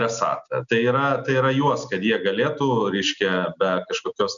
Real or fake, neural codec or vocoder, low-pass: real; none; 7.2 kHz